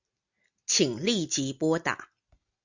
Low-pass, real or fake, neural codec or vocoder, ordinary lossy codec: 7.2 kHz; real; none; Opus, 64 kbps